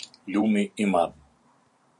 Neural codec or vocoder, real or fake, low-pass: vocoder, 44.1 kHz, 128 mel bands every 512 samples, BigVGAN v2; fake; 10.8 kHz